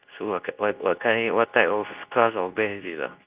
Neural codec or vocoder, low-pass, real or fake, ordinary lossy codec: codec, 24 kHz, 0.9 kbps, WavTokenizer, medium speech release version 2; 3.6 kHz; fake; Opus, 32 kbps